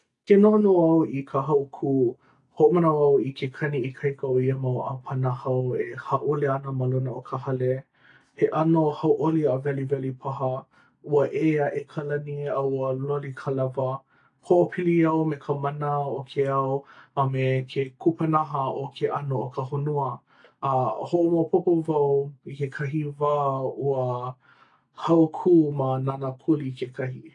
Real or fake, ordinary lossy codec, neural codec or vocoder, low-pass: real; AAC, 48 kbps; none; 10.8 kHz